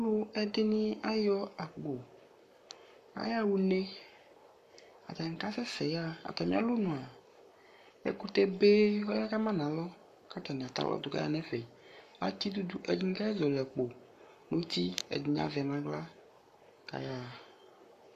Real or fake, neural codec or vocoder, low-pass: fake; codec, 44.1 kHz, 7.8 kbps, Pupu-Codec; 14.4 kHz